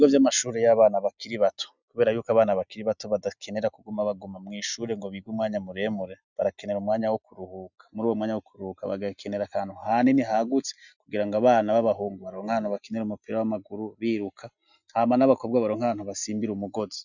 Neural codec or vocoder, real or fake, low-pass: none; real; 7.2 kHz